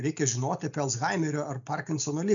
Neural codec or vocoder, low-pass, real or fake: none; 7.2 kHz; real